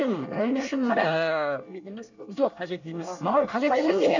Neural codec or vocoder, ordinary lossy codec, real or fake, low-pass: codec, 24 kHz, 1 kbps, SNAC; none; fake; 7.2 kHz